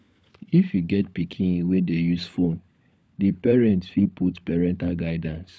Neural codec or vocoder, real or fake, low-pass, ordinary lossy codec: codec, 16 kHz, 16 kbps, FunCodec, trained on LibriTTS, 50 frames a second; fake; none; none